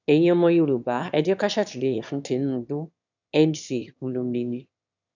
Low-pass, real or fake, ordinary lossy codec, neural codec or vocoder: 7.2 kHz; fake; none; autoencoder, 22.05 kHz, a latent of 192 numbers a frame, VITS, trained on one speaker